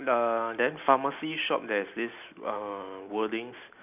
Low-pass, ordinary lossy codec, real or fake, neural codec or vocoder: 3.6 kHz; none; real; none